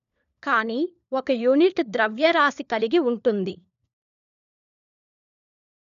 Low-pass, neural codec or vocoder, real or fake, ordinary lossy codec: 7.2 kHz; codec, 16 kHz, 4 kbps, FunCodec, trained on LibriTTS, 50 frames a second; fake; none